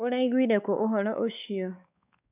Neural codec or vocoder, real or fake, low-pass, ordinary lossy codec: codec, 16 kHz, 4 kbps, X-Codec, WavLM features, trained on Multilingual LibriSpeech; fake; 3.6 kHz; none